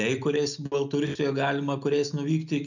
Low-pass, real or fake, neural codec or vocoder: 7.2 kHz; real; none